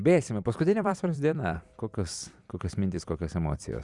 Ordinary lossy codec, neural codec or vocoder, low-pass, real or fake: Opus, 64 kbps; vocoder, 44.1 kHz, 128 mel bands every 256 samples, BigVGAN v2; 10.8 kHz; fake